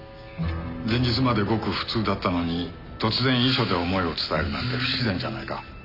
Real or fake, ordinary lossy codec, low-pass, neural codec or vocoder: real; none; 5.4 kHz; none